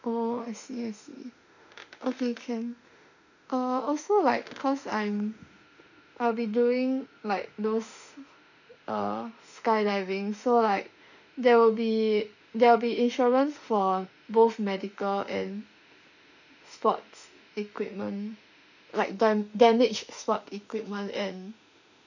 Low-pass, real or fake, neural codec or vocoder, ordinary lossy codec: 7.2 kHz; fake; autoencoder, 48 kHz, 32 numbers a frame, DAC-VAE, trained on Japanese speech; none